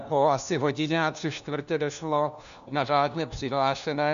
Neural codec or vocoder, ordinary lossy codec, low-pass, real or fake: codec, 16 kHz, 1 kbps, FunCodec, trained on LibriTTS, 50 frames a second; MP3, 96 kbps; 7.2 kHz; fake